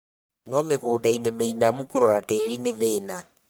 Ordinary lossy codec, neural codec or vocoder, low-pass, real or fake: none; codec, 44.1 kHz, 1.7 kbps, Pupu-Codec; none; fake